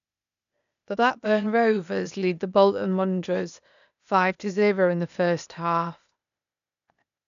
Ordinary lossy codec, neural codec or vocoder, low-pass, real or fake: none; codec, 16 kHz, 0.8 kbps, ZipCodec; 7.2 kHz; fake